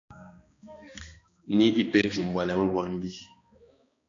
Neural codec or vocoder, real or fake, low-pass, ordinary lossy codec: codec, 16 kHz, 2 kbps, X-Codec, HuBERT features, trained on balanced general audio; fake; 7.2 kHz; AAC, 48 kbps